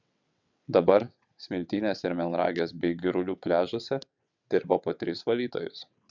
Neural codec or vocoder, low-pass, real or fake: vocoder, 22.05 kHz, 80 mel bands, WaveNeXt; 7.2 kHz; fake